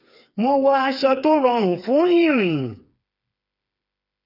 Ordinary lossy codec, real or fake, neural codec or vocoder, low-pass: none; fake; codec, 16 kHz, 4 kbps, FreqCodec, smaller model; 5.4 kHz